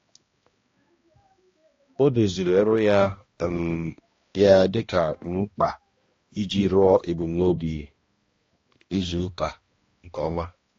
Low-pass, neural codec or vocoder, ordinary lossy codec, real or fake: 7.2 kHz; codec, 16 kHz, 1 kbps, X-Codec, HuBERT features, trained on balanced general audio; AAC, 32 kbps; fake